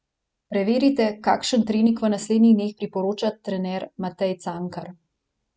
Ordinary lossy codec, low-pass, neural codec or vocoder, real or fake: none; none; none; real